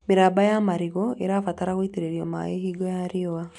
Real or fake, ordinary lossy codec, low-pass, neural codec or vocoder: real; MP3, 96 kbps; 10.8 kHz; none